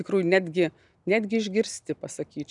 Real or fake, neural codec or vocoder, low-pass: real; none; 10.8 kHz